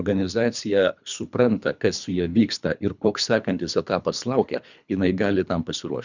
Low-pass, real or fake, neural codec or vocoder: 7.2 kHz; fake; codec, 24 kHz, 3 kbps, HILCodec